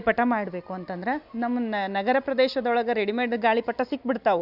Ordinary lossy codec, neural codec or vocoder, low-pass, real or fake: none; none; 5.4 kHz; real